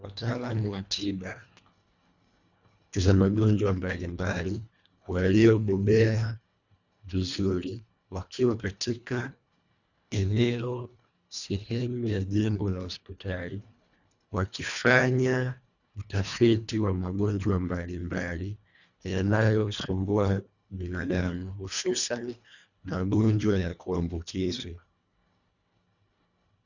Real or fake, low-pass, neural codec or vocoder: fake; 7.2 kHz; codec, 24 kHz, 1.5 kbps, HILCodec